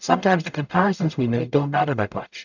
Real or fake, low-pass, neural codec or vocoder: fake; 7.2 kHz; codec, 44.1 kHz, 0.9 kbps, DAC